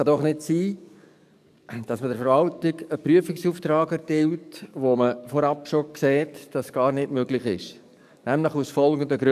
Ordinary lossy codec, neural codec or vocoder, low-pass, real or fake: none; codec, 44.1 kHz, 7.8 kbps, DAC; 14.4 kHz; fake